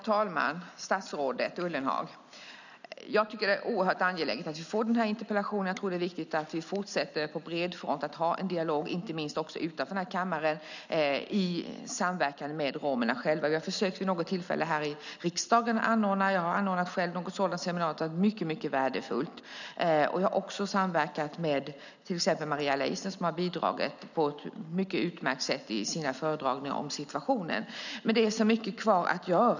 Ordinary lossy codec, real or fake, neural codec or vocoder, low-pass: none; real; none; 7.2 kHz